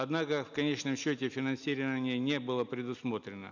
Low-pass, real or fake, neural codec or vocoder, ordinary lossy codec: 7.2 kHz; real; none; none